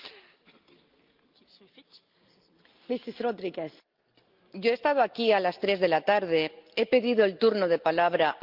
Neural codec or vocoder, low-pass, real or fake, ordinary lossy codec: none; 5.4 kHz; real; Opus, 32 kbps